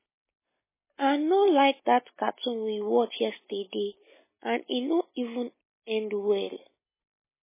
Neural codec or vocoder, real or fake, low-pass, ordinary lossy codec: none; real; 3.6 kHz; MP3, 16 kbps